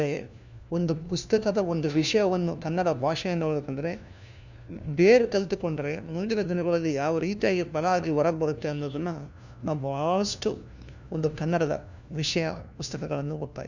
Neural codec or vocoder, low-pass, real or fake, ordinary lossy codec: codec, 16 kHz, 1 kbps, FunCodec, trained on LibriTTS, 50 frames a second; 7.2 kHz; fake; none